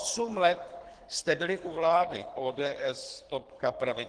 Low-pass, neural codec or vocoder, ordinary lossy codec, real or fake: 9.9 kHz; codec, 24 kHz, 3 kbps, HILCodec; Opus, 16 kbps; fake